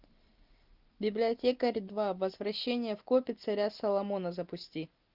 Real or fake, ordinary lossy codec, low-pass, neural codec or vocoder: real; Opus, 32 kbps; 5.4 kHz; none